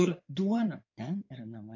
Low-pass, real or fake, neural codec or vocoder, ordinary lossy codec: 7.2 kHz; fake; codec, 16 kHz in and 24 kHz out, 2.2 kbps, FireRedTTS-2 codec; AAC, 48 kbps